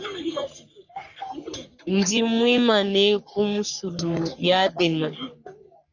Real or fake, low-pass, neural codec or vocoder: fake; 7.2 kHz; codec, 44.1 kHz, 3.4 kbps, Pupu-Codec